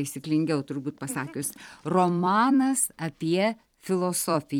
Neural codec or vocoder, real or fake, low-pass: vocoder, 44.1 kHz, 128 mel bands every 256 samples, BigVGAN v2; fake; 19.8 kHz